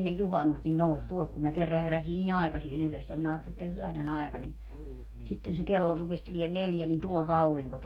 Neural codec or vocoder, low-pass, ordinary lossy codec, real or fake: codec, 44.1 kHz, 2.6 kbps, DAC; 19.8 kHz; none; fake